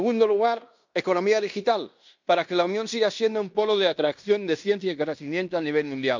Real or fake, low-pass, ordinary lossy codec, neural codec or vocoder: fake; 7.2 kHz; MP3, 64 kbps; codec, 16 kHz in and 24 kHz out, 0.9 kbps, LongCat-Audio-Codec, fine tuned four codebook decoder